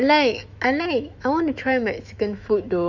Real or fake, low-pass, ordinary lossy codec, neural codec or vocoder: fake; 7.2 kHz; none; autoencoder, 48 kHz, 128 numbers a frame, DAC-VAE, trained on Japanese speech